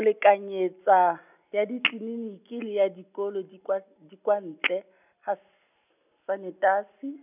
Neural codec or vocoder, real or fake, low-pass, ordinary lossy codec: none; real; 3.6 kHz; none